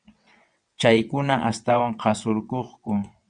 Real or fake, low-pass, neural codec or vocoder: fake; 9.9 kHz; vocoder, 22.05 kHz, 80 mel bands, WaveNeXt